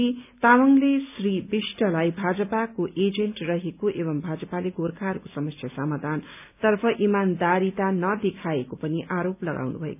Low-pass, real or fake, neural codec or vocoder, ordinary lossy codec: 3.6 kHz; real; none; none